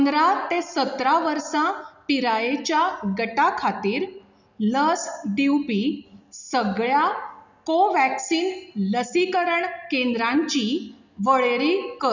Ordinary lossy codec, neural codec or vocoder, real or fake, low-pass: none; none; real; 7.2 kHz